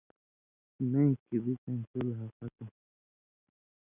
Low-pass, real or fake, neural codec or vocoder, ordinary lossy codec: 3.6 kHz; real; none; Opus, 64 kbps